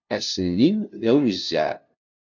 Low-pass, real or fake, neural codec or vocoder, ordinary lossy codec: 7.2 kHz; fake; codec, 16 kHz, 0.5 kbps, FunCodec, trained on LibriTTS, 25 frames a second; MP3, 64 kbps